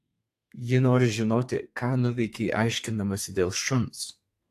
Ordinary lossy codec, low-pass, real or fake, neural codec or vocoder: AAC, 64 kbps; 14.4 kHz; fake; codec, 32 kHz, 1.9 kbps, SNAC